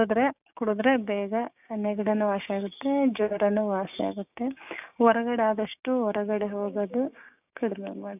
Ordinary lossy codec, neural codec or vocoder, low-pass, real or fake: none; codec, 16 kHz, 6 kbps, DAC; 3.6 kHz; fake